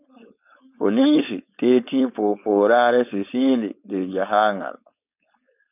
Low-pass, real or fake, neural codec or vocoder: 3.6 kHz; fake; codec, 16 kHz, 4.8 kbps, FACodec